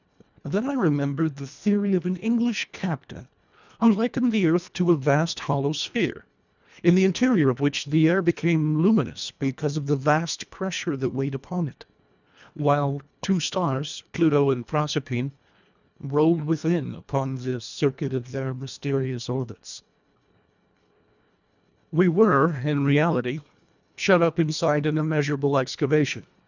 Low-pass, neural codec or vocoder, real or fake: 7.2 kHz; codec, 24 kHz, 1.5 kbps, HILCodec; fake